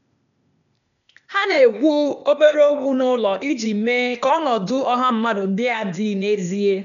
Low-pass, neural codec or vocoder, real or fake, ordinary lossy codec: 7.2 kHz; codec, 16 kHz, 0.8 kbps, ZipCodec; fake; none